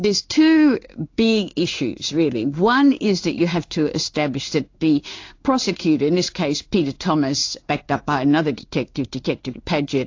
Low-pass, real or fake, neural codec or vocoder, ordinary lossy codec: 7.2 kHz; fake; codec, 16 kHz in and 24 kHz out, 1 kbps, XY-Tokenizer; AAC, 48 kbps